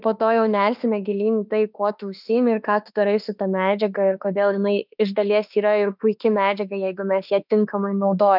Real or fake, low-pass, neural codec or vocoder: fake; 5.4 kHz; autoencoder, 48 kHz, 32 numbers a frame, DAC-VAE, trained on Japanese speech